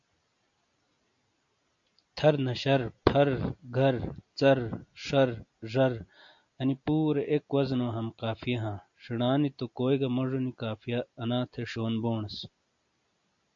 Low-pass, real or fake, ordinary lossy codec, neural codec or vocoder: 7.2 kHz; real; AAC, 64 kbps; none